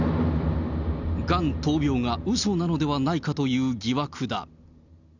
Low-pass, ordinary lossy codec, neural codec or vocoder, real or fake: 7.2 kHz; none; none; real